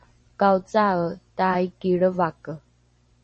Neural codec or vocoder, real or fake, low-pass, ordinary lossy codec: vocoder, 44.1 kHz, 128 mel bands every 512 samples, BigVGAN v2; fake; 10.8 kHz; MP3, 32 kbps